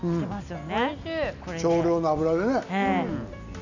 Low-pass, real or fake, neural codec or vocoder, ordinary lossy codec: 7.2 kHz; real; none; none